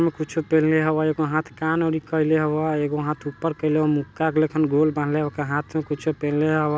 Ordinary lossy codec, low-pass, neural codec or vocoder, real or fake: none; none; none; real